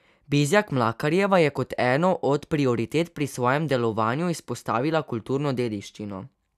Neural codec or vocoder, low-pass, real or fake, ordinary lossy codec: none; 14.4 kHz; real; none